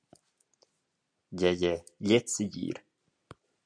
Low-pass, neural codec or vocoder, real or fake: 9.9 kHz; none; real